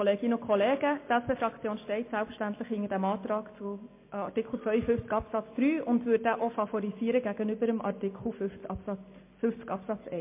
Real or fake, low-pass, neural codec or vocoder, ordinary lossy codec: real; 3.6 kHz; none; MP3, 24 kbps